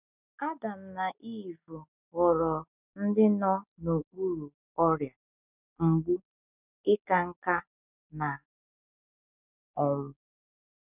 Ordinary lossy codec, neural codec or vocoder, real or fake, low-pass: none; none; real; 3.6 kHz